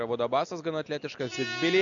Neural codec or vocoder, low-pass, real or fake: none; 7.2 kHz; real